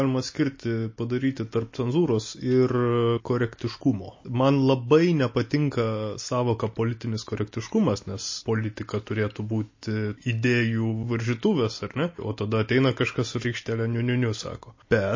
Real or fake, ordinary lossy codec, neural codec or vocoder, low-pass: real; MP3, 32 kbps; none; 7.2 kHz